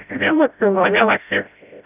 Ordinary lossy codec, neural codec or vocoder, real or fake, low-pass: none; codec, 16 kHz, 0.5 kbps, FreqCodec, smaller model; fake; 3.6 kHz